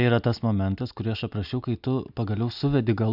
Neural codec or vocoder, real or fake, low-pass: none; real; 5.4 kHz